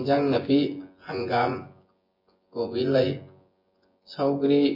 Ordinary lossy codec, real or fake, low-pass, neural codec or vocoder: MP3, 32 kbps; fake; 5.4 kHz; vocoder, 24 kHz, 100 mel bands, Vocos